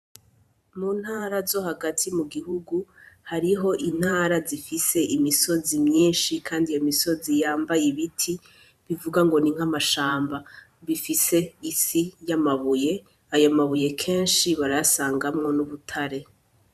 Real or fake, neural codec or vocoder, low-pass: fake; vocoder, 44.1 kHz, 128 mel bands every 512 samples, BigVGAN v2; 14.4 kHz